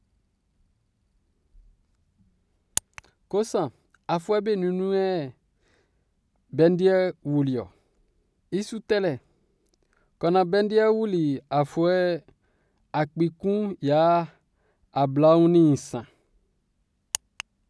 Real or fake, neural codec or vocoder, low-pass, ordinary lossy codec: real; none; none; none